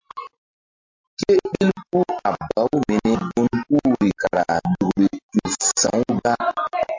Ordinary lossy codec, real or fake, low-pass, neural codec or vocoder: MP3, 48 kbps; real; 7.2 kHz; none